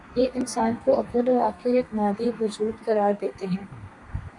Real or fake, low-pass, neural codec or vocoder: fake; 10.8 kHz; codec, 32 kHz, 1.9 kbps, SNAC